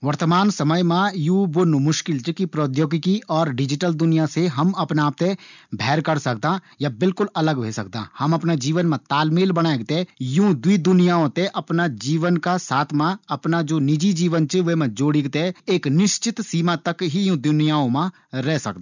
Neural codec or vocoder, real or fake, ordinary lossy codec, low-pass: none; real; none; 7.2 kHz